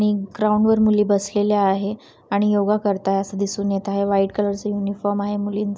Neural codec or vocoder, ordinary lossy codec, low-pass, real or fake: none; none; none; real